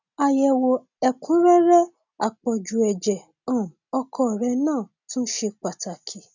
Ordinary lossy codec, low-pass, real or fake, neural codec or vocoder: none; 7.2 kHz; real; none